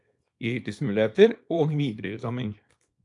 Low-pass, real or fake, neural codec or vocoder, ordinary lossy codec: 10.8 kHz; fake; codec, 24 kHz, 0.9 kbps, WavTokenizer, small release; AAC, 64 kbps